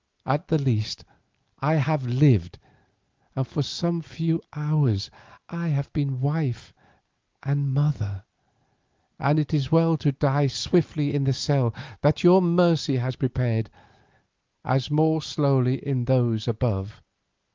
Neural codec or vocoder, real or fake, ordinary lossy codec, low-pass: none; real; Opus, 32 kbps; 7.2 kHz